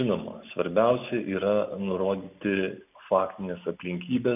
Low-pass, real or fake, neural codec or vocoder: 3.6 kHz; real; none